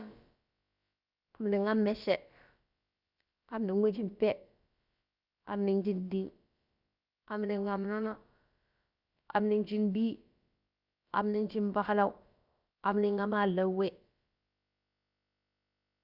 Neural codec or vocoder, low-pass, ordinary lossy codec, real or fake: codec, 16 kHz, about 1 kbps, DyCAST, with the encoder's durations; 5.4 kHz; Opus, 64 kbps; fake